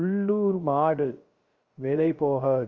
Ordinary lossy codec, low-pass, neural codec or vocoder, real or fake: Opus, 32 kbps; 7.2 kHz; codec, 16 kHz, 0.3 kbps, FocalCodec; fake